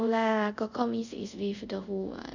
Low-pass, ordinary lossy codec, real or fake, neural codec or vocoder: 7.2 kHz; none; fake; codec, 24 kHz, 0.5 kbps, DualCodec